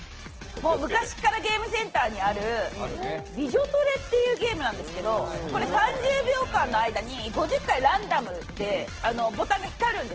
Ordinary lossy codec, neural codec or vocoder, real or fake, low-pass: Opus, 16 kbps; none; real; 7.2 kHz